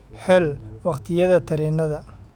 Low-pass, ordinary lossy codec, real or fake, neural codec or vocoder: 19.8 kHz; none; fake; autoencoder, 48 kHz, 128 numbers a frame, DAC-VAE, trained on Japanese speech